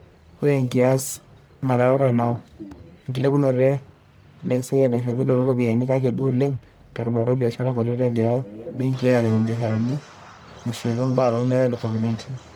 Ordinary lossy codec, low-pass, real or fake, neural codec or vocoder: none; none; fake; codec, 44.1 kHz, 1.7 kbps, Pupu-Codec